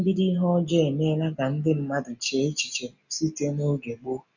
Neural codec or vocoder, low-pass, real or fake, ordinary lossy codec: none; 7.2 kHz; real; none